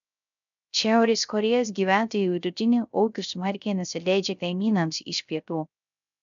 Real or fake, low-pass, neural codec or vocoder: fake; 7.2 kHz; codec, 16 kHz, 0.3 kbps, FocalCodec